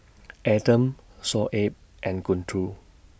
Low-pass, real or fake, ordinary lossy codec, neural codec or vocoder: none; real; none; none